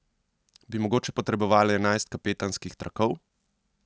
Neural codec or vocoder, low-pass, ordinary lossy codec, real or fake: none; none; none; real